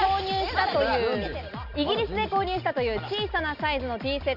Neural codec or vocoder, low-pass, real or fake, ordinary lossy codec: none; 5.4 kHz; real; none